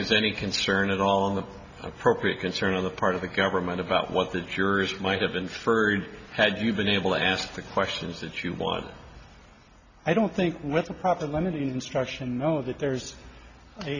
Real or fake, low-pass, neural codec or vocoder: real; 7.2 kHz; none